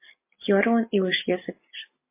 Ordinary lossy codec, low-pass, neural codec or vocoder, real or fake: MP3, 24 kbps; 3.6 kHz; none; real